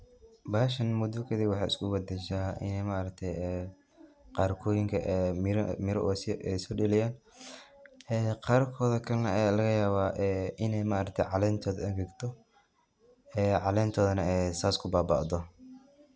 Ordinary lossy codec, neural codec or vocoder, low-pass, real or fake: none; none; none; real